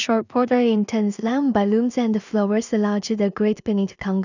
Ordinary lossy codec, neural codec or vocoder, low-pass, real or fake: none; codec, 16 kHz in and 24 kHz out, 0.4 kbps, LongCat-Audio-Codec, two codebook decoder; 7.2 kHz; fake